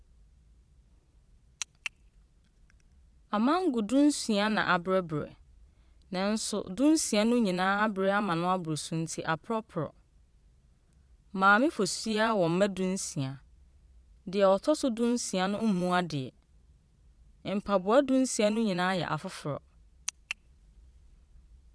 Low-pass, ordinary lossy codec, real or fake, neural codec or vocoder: none; none; fake; vocoder, 22.05 kHz, 80 mel bands, Vocos